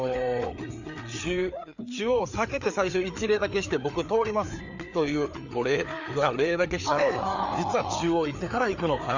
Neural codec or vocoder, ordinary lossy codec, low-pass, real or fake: codec, 16 kHz, 4 kbps, FreqCodec, larger model; none; 7.2 kHz; fake